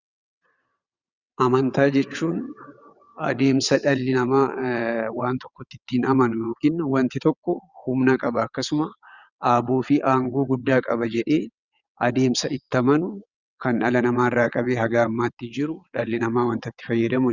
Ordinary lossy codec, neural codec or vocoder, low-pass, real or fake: Opus, 64 kbps; vocoder, 22.05 kHz, 80 mel bands, WaveNeXt; 7.2 kHz; fake